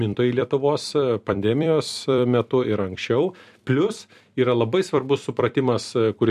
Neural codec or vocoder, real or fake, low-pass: vocoder, 44.1 kHz, 128 mel bands every 256 samples, BigVGAN v2; fake; 14.4 kHz